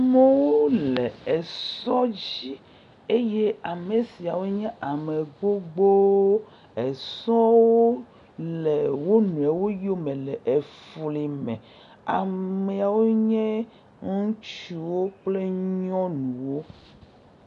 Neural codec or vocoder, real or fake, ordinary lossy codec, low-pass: none; real; MP3, 64 kbps; 10.8 kHz